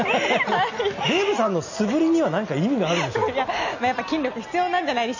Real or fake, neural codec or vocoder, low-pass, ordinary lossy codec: real; none; 7.2 kHz; none